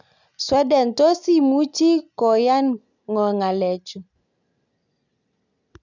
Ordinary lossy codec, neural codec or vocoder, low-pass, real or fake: none; none; 7.2 kHz; real